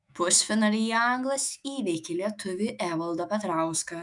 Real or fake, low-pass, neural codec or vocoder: fake; 10.8 kHz; autoencoder, 48 kHz, 128 numbers a frame, DAC-VAE, trained on Japanese speech